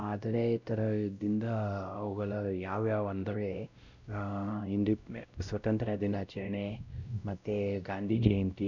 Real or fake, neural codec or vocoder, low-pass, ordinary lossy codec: fake; codec, 16 kHz, 0.5 kbps, X-Codec, WavLM features, trained on Multilingual LibriSpeech; 7.2 kHz; none